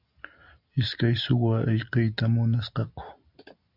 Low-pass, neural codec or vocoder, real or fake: 5.4 kHz; none; real